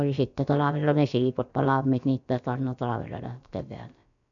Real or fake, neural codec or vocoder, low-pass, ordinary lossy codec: fake; codec, 16 kHz, about 1 kbps, DyCAST, with the encoder's durations; 7.2 kHz; none